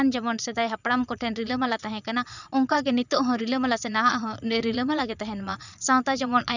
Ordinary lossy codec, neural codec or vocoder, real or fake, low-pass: none; vocoder, 22.05 kHz, 80 mel bands, Vocos; fake; 7.2 kHz